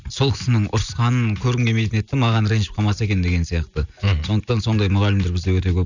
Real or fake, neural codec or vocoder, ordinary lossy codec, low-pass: real; none; none; 7.2 kHz